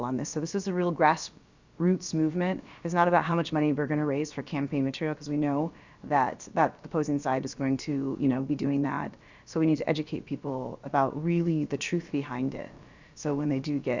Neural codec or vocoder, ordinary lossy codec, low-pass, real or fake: codec, 16 kHz, about 1 kbps, DyCAST, with the encoder's durations; Opus, 64 kbps; 7.2 kHz; fake